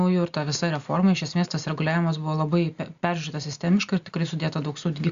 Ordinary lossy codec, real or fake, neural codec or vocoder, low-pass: Opus, 64 kbps; real; none; 7.2 kHz